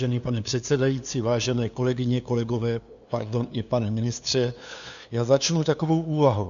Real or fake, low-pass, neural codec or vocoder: fake; 7.2 kHz; codec, 16 kHz, 2 kbps, FunCodec, trained on LibriTTS, 25 frames a second